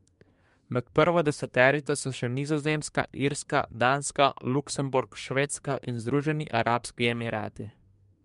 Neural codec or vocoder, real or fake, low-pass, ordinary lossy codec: codec, 24 kHz, 1 kbps, SNAC; fake; 10.8 kHz; MP3, 64 kbps